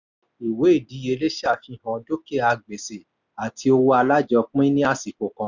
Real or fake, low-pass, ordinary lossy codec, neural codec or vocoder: real; 7.2 kHz; none; none